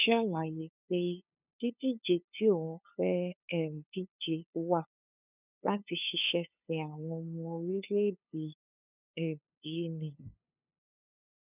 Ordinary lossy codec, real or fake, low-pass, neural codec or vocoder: none; fake; 3.6 kHz; codec, 16 kHz, 2 kbps, FunCodec, trained on LibriTTS, 25 frames a second